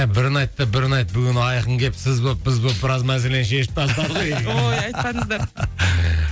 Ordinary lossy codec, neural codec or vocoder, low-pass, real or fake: none; none; none; real